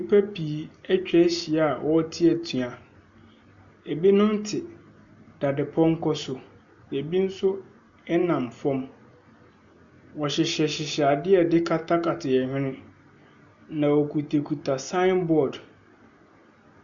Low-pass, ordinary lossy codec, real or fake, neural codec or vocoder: 7.2 kHz; MP3, 64 kbps; real; none